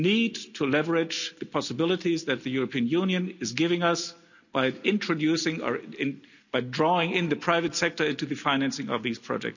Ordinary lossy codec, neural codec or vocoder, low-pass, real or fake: none; none; 7.2 kHz; real